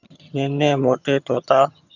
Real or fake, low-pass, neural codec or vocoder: fake; 7.2 kHz; vocoder, 22.05 kHz, 80 mel bands, HiFi-GAN